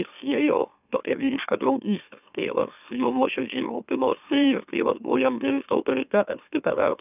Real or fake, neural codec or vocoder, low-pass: fake; autoencoder, 44.1 kHz, a latent of 192 numbers a frame, MeloTTS; 3.6 kHz